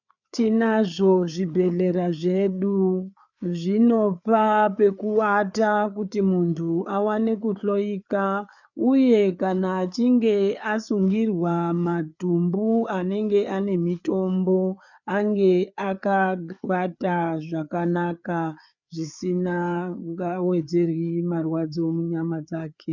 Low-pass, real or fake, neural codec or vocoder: 7.2 kHz; fake; codec, 16 kHz, 4 kbps, FreqCodec, larger model